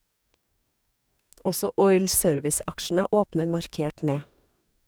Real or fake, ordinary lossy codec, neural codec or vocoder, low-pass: fake; none; codec, 44.1 kHz, 2.6 kbps, DAC; none